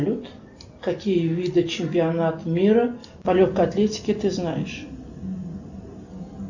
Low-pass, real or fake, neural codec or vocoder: 7.2 kHz; real; none